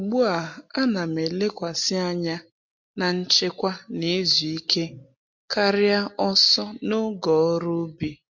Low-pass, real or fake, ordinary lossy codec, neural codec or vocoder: 7.2 kHz; real; MP3, 48 kbps; none